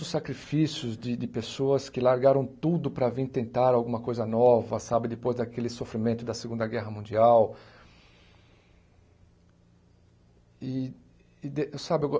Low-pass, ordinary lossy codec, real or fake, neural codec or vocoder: none; none; real; none